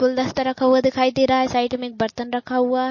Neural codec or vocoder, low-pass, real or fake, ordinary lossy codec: none; 7.2 kHz; real; MP3, 32 kbps